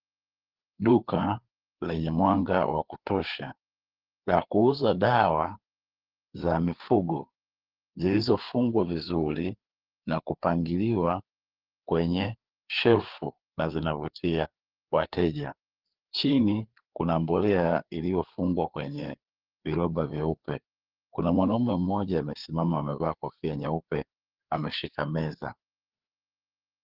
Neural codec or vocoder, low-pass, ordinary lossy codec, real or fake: codec, 16 kHz, 4 kbps, FreqCodec, larger model; 5.4 kHz; Opus, 16 kbps; fake